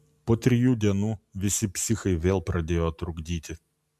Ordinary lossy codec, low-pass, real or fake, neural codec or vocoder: MP3, 96 kbps; 14.4 kHz; real; none